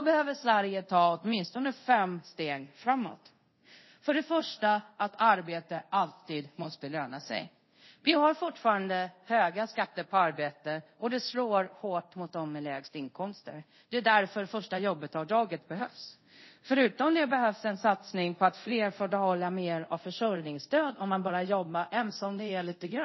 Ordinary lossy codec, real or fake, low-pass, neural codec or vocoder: MP3, 24 kbps; fake; 7.2 kHz; codec, 24 kHz, 0.5 kbps, DualCodec